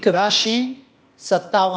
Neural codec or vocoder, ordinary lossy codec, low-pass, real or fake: codec, 16 kHz, 0.8 kbps, ZipCodec; none; none; fake